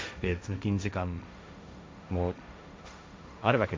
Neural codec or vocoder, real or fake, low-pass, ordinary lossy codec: codec, 16 kHz, 1.1 kbps, Voila-Tokenizer; fake; none; none